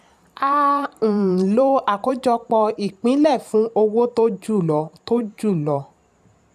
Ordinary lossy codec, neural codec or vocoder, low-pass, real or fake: none; vocoder, 44.1 kHz, 128 mel bands every 512 samples, BigVGAN v2; 14.4 kHz; fake